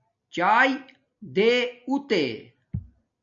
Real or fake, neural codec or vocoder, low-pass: real; none; 7.2 kHz